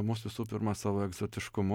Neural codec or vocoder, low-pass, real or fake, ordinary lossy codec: none; 19.8 kHz; real; MP3, 96 kbps